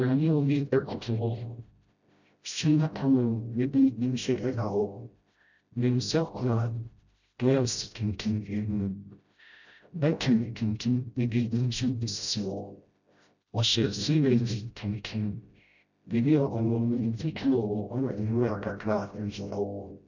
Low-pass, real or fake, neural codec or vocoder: 7.2 kHz; fake; codec, 16 kHz, 0.5 kbps, FreqCodec, smaller model